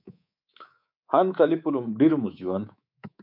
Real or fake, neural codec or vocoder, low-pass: fake; codec, 24 kHz, 3.1 kbps, DualCodec; 5.4 kHz